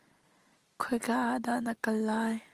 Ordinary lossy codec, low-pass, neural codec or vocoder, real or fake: Opus, 24 kbps; 14.4 kHz; none; real